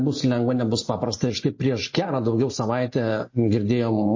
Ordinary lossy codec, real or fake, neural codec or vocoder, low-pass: MP3, 32 kbps; real; none; 7.2 kHz